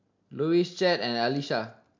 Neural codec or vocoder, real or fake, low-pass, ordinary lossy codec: none; real; 7.2 kHz; MP3, 48 kbps